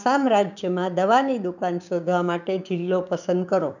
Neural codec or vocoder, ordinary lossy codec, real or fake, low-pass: codec, 16 kHz, 6 kbps, DAC; none; fake; 7.2 kHz